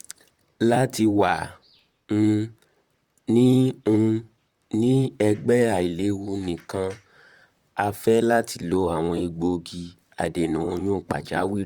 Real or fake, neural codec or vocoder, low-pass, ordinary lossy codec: fake; vocoder, 44.1 kHz, 128 mel bands, Pupu-Vocoder; 19.8 kHz; Opus, 64 kbps